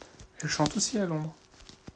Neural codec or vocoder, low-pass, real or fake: none; 9.9 kHz; real